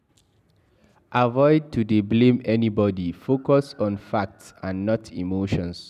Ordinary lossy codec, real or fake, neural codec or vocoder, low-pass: none; real; none; 14.4 kHz